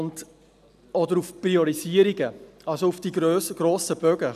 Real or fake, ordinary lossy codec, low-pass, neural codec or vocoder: real; none; 14.4 kHz; none